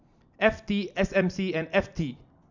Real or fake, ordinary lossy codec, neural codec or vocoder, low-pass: real; none; none; 7.2 kHz